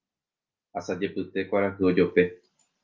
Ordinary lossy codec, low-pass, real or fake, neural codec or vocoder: Opus, 24 kbps; 7.2 kHz; real; none